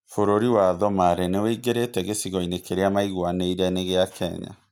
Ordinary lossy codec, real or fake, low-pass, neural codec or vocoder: none; real; none; none